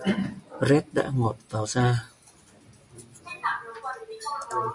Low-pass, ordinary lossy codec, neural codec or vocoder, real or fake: 10.8 kHz; MP3, 96 kbps; none; real